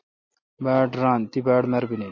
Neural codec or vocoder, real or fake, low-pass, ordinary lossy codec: none; real; 7.2 kHz; MP3, 32 kbps